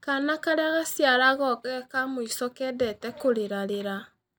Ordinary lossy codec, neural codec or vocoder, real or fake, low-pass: none; none; real; none